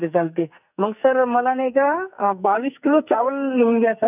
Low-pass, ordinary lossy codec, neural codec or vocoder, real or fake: 3.6 kHz; none; codec, 44.1 kHz, 2.6 kbps, SNAC; fake